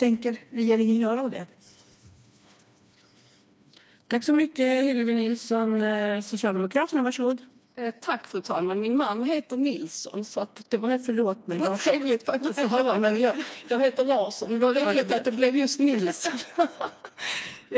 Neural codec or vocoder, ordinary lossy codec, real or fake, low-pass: codec, 16 kHz, 2 kbps, FreqCodec, smaller model; none; fake; none